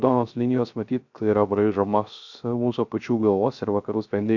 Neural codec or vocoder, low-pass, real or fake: codec, 16 kHz, 0.3 kbps, FocalCodec; 7.2 kHz; fake